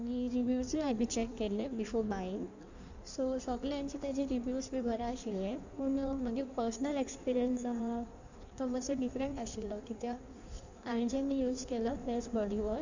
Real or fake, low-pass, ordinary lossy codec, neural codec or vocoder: fake; 7.2 kHz; none; codec, 16 kHz in and 24 kHz out, 1.1 kbps, FireRedTTS-2 codec